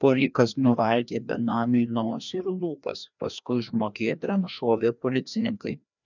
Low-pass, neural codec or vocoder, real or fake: 7.2 kHz; codec, 16 kHz, 1 kbps, FreqCodec, larger model; fake